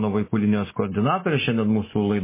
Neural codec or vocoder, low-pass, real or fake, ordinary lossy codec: none; 3.6 kHz; real; MP3, 16 kbps